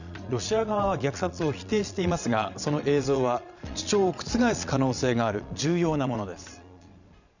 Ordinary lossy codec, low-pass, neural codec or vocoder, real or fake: none; 7.2 kHz; vocoder, 22.05 kHz, 80 mel bands, Vocos; fake